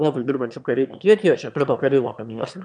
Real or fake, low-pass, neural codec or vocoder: fake; 9.9 kHz; autoencoder, 22.05 kHz, a latent of 192 numbers a frame, VITS, trained on one speaker